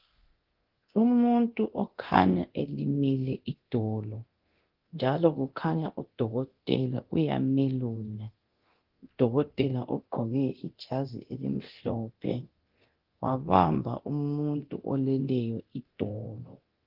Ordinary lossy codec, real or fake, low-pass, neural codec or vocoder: Opus, 16 kbps; fake; 5.4 kHz; codec, 24 kHz, 0.9 kbps, DualCodec